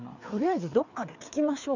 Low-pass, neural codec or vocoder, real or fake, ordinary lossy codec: 7.2 kHz; codec, 16 kHz, 4 kbps, FreqCodec, larger model; fake; none